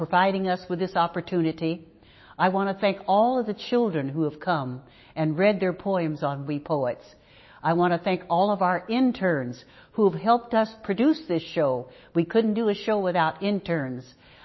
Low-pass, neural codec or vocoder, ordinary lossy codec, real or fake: 7.2 kHz; autoencoder, 48 kHz, 128 numbers a frame, DAC-VAE, trained on Japanese speech; MP3, 24 kbps; fake